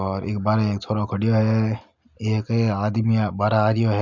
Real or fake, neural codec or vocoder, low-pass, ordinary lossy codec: real; none; 7.2 kHz; MP3, 64 kbps